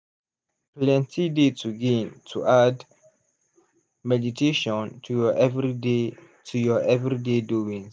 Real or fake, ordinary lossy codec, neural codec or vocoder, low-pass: real; none; none; none